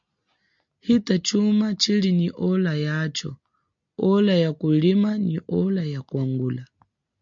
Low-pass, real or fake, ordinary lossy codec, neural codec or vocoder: 7.2 kHz; real; MP3, 64 kbps; none